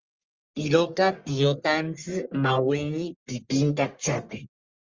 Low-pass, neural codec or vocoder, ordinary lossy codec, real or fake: 7.2 kHz; codec, 44.1 kHz, 1.7 kbps, Pupu-Codec; Opus, 32 kbps; fake